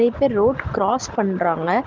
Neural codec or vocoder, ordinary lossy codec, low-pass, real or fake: none; Opus, 16 kbps; 7.2 kHz; real